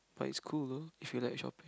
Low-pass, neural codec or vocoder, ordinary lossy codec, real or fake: none; none; none; real